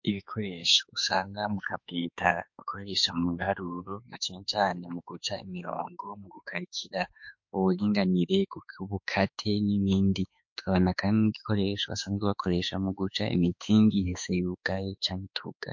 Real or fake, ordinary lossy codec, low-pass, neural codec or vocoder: fake; MP3, 48 kbps; 7.2 kHz; autoencoder, 48 kHz, 32 numbers a frame, DAC-VAE, trained on Japanese speech